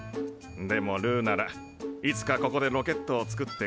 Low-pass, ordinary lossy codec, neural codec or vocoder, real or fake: none; none; none; real